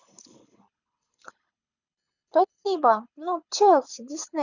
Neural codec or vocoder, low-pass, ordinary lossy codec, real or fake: codec, 24 kHz, 6 kbps, HILCodec; 7.2 kHz; none; fake